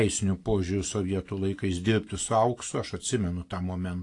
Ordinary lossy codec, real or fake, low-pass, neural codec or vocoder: AAC, 48 kbps; real; 10.8 kHz; none